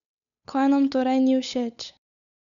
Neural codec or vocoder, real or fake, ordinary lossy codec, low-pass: codec, 16 kHz, 8 kbps, FunCodec, trained on Chinese and English, 25 frames a second; fake; none; 7.2 kHz